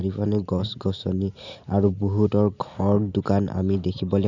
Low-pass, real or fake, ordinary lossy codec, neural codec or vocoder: 7.2 kHz; real; none; none